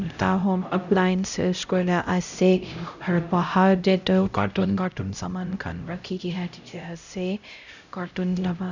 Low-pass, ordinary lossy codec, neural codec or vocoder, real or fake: 7.2 kHz; none; codec, 16 kHz, 0.5 kbps, X-Codec, HuBERT features, trained on LibriSpeech; fake